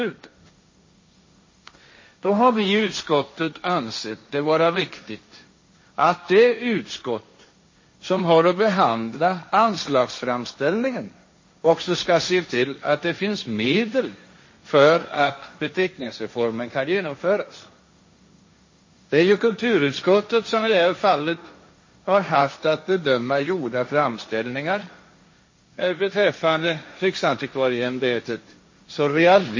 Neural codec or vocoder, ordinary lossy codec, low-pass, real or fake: codec, 16 kHz, 1.1 kbps, Voila-Tokenizer; MP3, 32 kbps; 7.2 kHz; fake